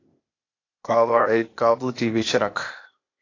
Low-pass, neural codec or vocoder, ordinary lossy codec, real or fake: 7.2 kHz; codec, 16 kHz, 0.8 kbps, ZipCodec; AAC, 32 kbps; fake